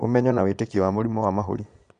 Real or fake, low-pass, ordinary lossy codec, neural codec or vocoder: fake; 9.9 kHz; none; vocoder, 22.05 kHz, 80 mel bands, Vocos